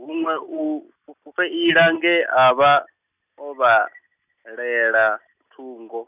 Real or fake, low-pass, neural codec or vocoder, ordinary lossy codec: real; 3.6 kHz; none; none